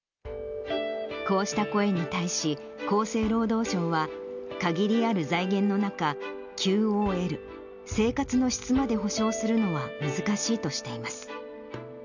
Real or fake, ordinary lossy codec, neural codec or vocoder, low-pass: real; none; none; 7.2 kHz